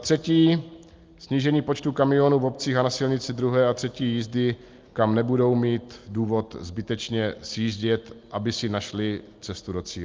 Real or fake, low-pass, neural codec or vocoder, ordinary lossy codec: real; 7.2 kHz; none; Opus, 24 kbps